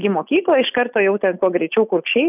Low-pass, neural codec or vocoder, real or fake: 3.6 kHz; none; real